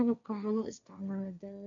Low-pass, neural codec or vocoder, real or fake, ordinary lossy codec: 7.2 kHz; codec, 16 kHz, 1.1 kbps, Voila-Tokenizer; fake; none